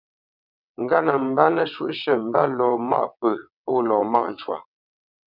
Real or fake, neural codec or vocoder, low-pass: fake; vocoder, 22.05 kHz, 80 mel bands, WaveNeXt; 5.4 kHz